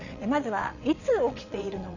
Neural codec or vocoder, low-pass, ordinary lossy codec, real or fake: vocoder, 22.05 kHz, 80 mel bands, WaveNeXt; 7.2 kHz; none; fake